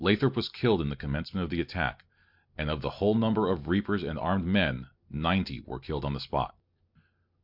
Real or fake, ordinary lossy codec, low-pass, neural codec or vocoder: real; MP3, 48 kbps; 5.4 kHz; none